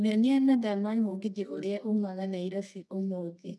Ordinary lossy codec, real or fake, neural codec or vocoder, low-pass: none; fake; codec, 24 kHz, 0.9 kbps, WavTokenizer, medium music audio release; none